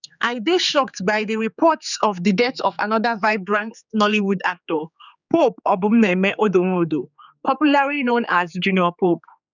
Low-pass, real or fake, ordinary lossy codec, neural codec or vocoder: 7.2 kHz; fake; none; codec, 16 kHz, 4 kbps, X-Codec, HuBERT features, trained on general audio